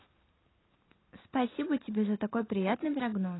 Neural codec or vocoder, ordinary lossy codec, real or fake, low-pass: none; AAC, 16 kbps; real; 7.2 kHz